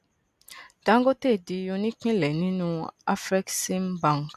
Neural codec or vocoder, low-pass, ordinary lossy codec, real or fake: none; 14.4 kHz; none; real